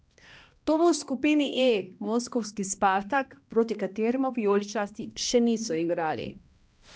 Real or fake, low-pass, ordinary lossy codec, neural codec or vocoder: fake; none; none; codec, 16 kHz, 1 kbps, X-Codec, HuBERT features, trained on balanced general audio